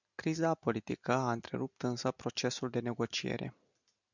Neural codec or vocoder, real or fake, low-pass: none; real; 7.2 kHz